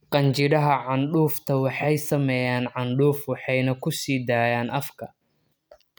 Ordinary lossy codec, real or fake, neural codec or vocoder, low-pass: none; real; none; none